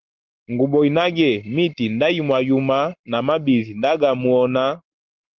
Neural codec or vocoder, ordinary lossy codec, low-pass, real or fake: none; Opus, 32 kbps; 7.2 kHz; real